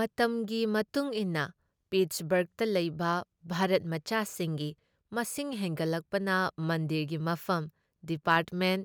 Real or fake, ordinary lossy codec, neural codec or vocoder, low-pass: real; none; none; none